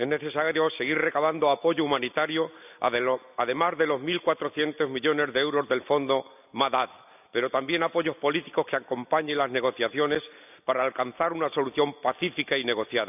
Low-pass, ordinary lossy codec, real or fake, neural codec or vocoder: 3.6 kHz; none; real; none